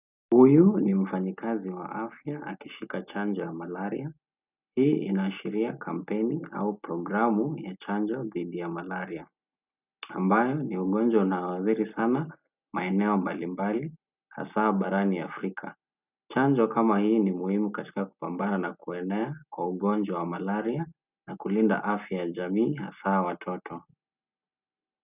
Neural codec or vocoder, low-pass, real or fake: none; 3.6 kHz; real